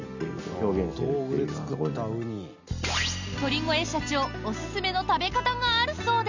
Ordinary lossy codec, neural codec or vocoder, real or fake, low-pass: none; none; real; 7.2 kHz